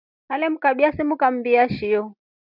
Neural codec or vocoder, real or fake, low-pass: none; real; 5.4 kHz